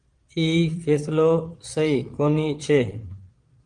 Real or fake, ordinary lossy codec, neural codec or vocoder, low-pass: fake; Opus, 24 kbps; vocoder, 22.05 kHz, 80 mel bands, Vocos; 9.9 kHz